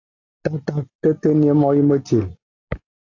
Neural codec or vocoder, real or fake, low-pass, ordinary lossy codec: none; real; 7.2 kHz; AAC, 48 kbps